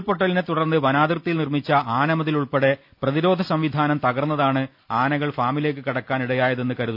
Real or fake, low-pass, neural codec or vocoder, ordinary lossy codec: real; 5.4 kHz; none; none